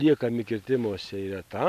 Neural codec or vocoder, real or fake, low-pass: none; real; 14.4 kHz